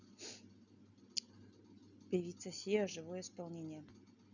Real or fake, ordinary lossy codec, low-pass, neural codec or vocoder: real; none; 7.2 kHz; none